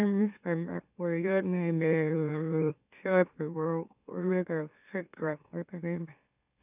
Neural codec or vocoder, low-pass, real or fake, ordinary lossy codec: autoencoder, 44.1 kHz, a latent of 192 numbers a frame, MeloTTS; 3.6 kHz; fake; none